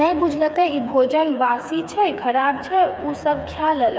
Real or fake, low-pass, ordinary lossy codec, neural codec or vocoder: fake; none; none; codec, 16 kHz, 4 kbps, FreqCodec, smaller model